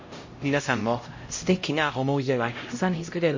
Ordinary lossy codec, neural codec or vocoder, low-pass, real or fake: MP3, 32 kbps; codec, 16 kHz, 0.5 kbps, X-Codec, HuBERT features, trained on LibriSpeech; 7.2 kHz; fake